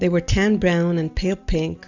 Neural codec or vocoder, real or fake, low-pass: none; real; 7.2 kHz